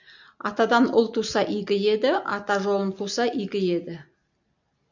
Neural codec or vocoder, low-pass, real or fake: none; 7.2 kHz; real